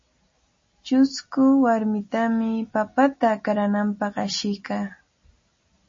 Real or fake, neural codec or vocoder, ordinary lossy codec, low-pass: real; none; MP3, 32 kbps; 7.2 kHz